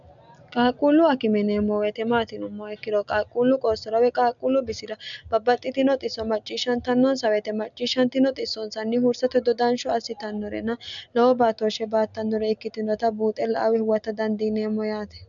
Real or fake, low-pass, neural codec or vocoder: real; 7.2 kHz; none